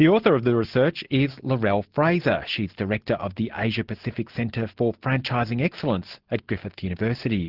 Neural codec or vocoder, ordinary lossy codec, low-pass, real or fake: none; Opus, 16 kbps; 5.4 kHz; real